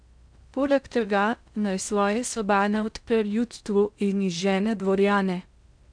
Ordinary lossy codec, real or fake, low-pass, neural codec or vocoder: none; fake; 9.9 kHz; codec, 16 kHz in and 24 kHz out, 0.6 kbps, FocalCodec, streaming, 2048 codes